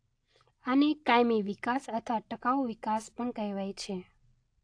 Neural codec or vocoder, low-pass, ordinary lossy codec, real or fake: none; 9.9 kHz; AAC, 48 kbps; real